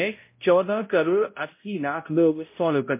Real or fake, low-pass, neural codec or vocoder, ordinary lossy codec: fake; 3.6 kHz; codec, 16 kHz, 0.5 kbps, X-Codec, HuBERT features, trained on balanced general audio; MP3, 24 kbps